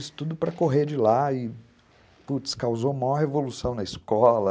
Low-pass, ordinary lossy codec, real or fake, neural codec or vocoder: none; none; real; none